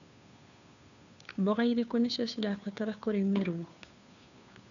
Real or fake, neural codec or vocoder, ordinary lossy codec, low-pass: fake; codec, 16 kHz, 2 kbps, FunCodec, trained on Chinese and English, 25 frames a second; none; 7.2 kHz